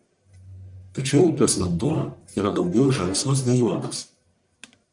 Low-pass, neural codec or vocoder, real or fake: 10.8 kHz; codec, 44.1 kHz, 1.7 kbps, Pupu-Codec; fake